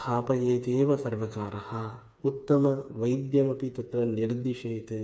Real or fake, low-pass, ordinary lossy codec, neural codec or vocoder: fake; none; none; codec, 16 kHz, 4 kbps, FreqCodec, smaller model